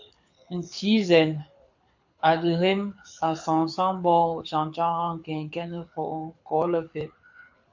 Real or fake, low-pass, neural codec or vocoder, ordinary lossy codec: fake; 7.2 kHz; codec, 24 kHz, 6 kbps, HILCodec; MP3, 48 kbps